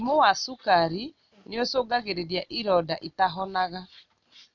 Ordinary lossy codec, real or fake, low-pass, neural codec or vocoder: none; real; 7.2 kHz; none